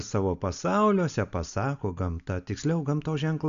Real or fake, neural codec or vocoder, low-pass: real; none; 7.2 kHz